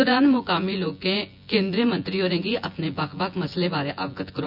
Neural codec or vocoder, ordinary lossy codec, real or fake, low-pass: vocoder, 24 kHz, 100 mel bands, Vocos; none; fake; 5.4 kHz